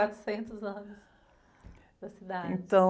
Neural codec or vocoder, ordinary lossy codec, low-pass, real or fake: none; none; none; real